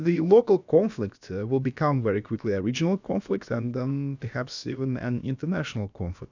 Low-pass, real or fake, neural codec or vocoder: 7.2 kHz; fake; codec, 16 kHz, about 1 kbps, DyCAST, with the encoder's durations